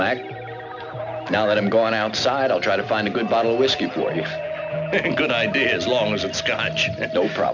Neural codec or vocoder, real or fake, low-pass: none; real; 7.2 kHz